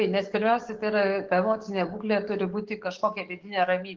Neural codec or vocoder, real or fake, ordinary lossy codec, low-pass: codec, 44.1 kHz, 7.8 kbps, DAC; fake; Opus, 16 kbps; 7.2 kHz